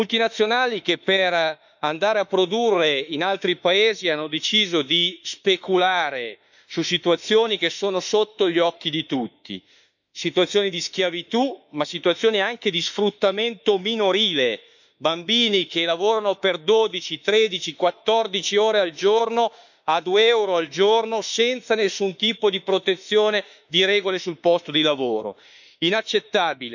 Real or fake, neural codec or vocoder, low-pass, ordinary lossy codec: fake; autoencoder, 48 kHz, 32 numbers a frame, DAC-VAE, trained on Japanese speech; 7.2 kHz; none